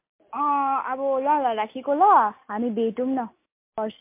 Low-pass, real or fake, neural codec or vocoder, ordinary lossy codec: 3.6 kHz; real; none; MP3, 24 kbps